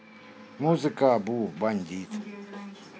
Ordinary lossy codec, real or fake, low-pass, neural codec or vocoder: none; real; none; none